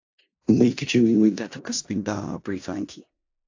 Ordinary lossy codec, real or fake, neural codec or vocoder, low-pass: AAC, 48 kbps; fake; codec, 16 kHz in and 24 kHz out, 0.4 kbps, LongCat-Audio-Codec, four codebook decoder; 7.2 kHz